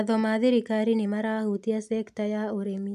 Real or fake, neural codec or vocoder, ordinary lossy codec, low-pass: real; none; none; 14.4 kHz